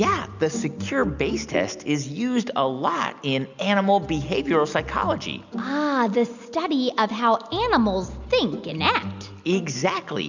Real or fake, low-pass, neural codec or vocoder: real; 7.2 kHz; none